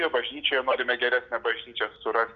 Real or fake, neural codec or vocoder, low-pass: real; none; 7.2 kHz